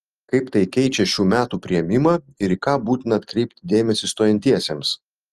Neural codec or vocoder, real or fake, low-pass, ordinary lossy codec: vocoder, 44.1 kHz, 128 mel bands every 256 samples, BigVGAN v2; fake; 14.4 kHz; Opus, 24 kbps